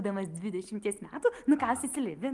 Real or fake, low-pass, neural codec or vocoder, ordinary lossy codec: real; 10.8 kHz; none; Opus, 24 kbps